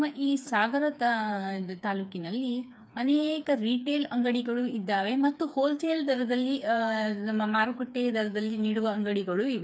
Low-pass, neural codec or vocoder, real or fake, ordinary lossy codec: none; codec, 16 kHz, 4 kbps, FreqCodec, smaller model; fake; none